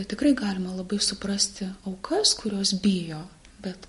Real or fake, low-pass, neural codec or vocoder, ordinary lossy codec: real; 14.4 kHz; none; MP3, 48 kbps